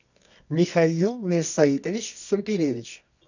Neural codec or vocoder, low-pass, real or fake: codec, 24 kHz, 0.9 kbps, WavTokenizer, medium music audio release; 7.2 kHz; fake